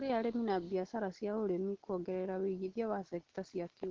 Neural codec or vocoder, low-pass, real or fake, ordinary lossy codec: none; 7.2 kHz; real; Opus, 16 kbps